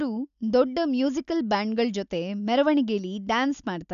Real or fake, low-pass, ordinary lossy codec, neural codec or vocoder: real; 7.2 kHz; none; none